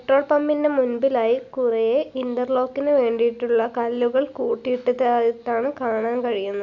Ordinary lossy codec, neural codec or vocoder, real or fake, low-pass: none; none; real; 7.2 kHz